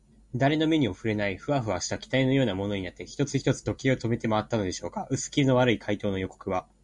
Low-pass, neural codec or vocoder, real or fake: 10.8 kHz; none; real